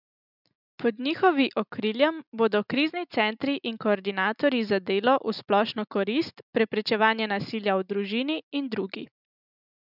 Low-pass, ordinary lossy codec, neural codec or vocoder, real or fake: 5.4 kHz; none; none; real